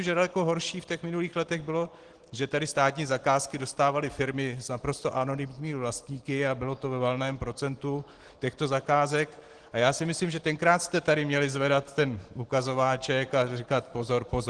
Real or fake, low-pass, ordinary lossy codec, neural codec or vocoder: real; 9.9 kHz; Opus, 16 kbps; none